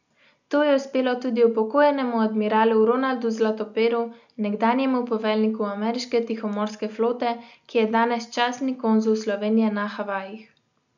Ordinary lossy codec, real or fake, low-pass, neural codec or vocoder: none; real; 7.2 kHz; none